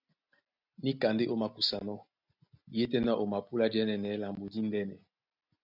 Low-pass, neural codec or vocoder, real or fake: 5.4 kHz; none; real